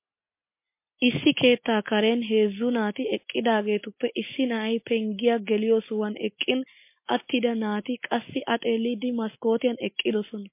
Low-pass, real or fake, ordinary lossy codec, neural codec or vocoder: 3.6 kHz; real; MP3, 24 kbps; none